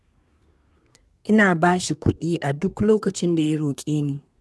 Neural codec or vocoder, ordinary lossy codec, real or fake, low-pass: codec, 24 kHz, 1 kbps, SNAC; none; fake; none